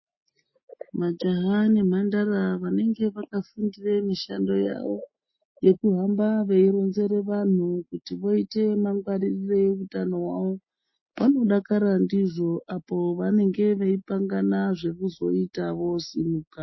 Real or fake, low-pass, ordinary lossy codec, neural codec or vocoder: real; 7.2 kHz; MP3, 24 kbps; none